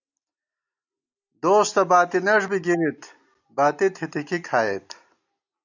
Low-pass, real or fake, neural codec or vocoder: 7.2 kHz; real; none